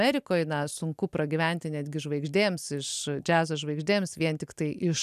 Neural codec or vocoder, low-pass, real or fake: none; 14.4 kHz; real